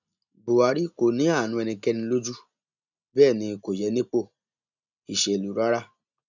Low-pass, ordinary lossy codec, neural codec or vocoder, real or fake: 7.2 kHz; none; none; real